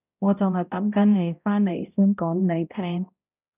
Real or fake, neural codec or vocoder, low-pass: fake; codec, 16 kHz, 0.5 kbps, X-Codec, HuBERT features, trained on balanced general audio; 3.6 kHz